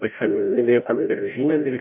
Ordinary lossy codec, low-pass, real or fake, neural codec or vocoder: MP3, 24 kbps; 3.6 kHz; fake; codec, 16 kHz, 0.5 kbps, FreqCodec, larger model